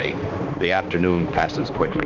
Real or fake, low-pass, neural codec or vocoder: fake; 7.2 kHz; codec, 16 kHz, 2 kbps, X-Codec, HuBERT features, trained on balanced general audio